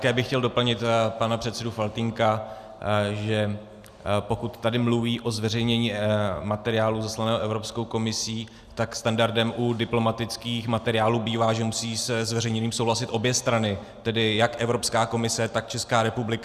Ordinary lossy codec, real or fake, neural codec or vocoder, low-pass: Opus, 64 kbps; real; none; 14.4 kHz